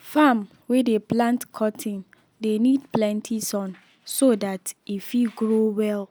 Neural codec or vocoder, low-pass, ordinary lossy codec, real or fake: none; none; none; real